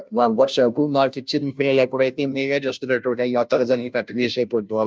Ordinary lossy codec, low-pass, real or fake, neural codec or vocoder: none; none; fake; codec, 16 kHz, 0.5 kbps, FunCodec, trained on Chinese and English, 25 frames a second